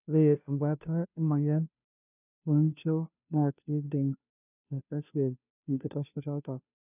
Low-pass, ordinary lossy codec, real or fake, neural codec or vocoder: 3.6 kHz; none; fake; codec, 16 kHz, 0.5 kbps, FunCodec, trained on Chinese and English, 25 frames a second